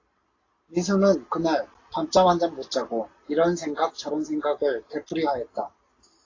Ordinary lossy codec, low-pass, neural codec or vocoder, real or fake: AAC, 32 kbps; 7.2 kHz; none; real